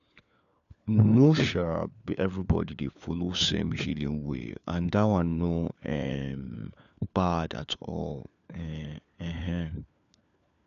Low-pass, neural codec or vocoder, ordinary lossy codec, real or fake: 7.2 kHz; codec, 16 kHz, 4 kbps, FunCodec, trained on LibriTTS, 50 frames a second; none; fake